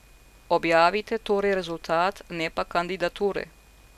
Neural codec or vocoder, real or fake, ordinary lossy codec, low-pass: none; real; none; 14.4 kHz